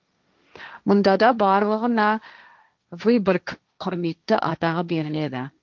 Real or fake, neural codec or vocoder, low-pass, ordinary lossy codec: fake; codec, 16 kHz, 1.1 kbps, Voila-Tokenizer; 7.2 kHz; Opus, 24 kbps